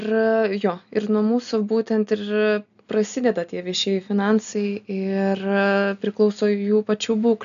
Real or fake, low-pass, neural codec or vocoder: real; 7.2 kHz; none